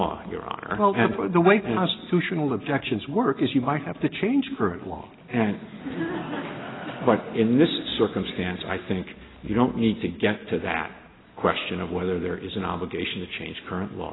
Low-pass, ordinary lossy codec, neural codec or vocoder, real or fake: 7.2 kHz; AAC, 16 kbps; none; real